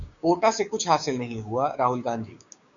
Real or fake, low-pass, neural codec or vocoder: fake; 7.2 kHz; codec, 16 kHz, 6 kbps, DAC